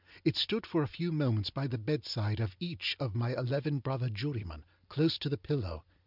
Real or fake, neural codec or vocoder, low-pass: real; none; 5.4 kHz